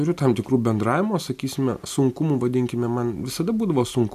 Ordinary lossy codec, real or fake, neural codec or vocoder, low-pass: MP3, 96 kbps; real; none; 14.4 kHz